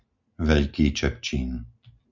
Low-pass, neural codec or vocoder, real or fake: 7.2 kHz; none; real